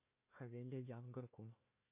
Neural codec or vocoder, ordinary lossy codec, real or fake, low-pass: codec, 16 kHz, 1 kbps, FunCodec, trained on Chinese and English, 50 frames a second; MP3, 24 kbps; fake; 3.6 kHz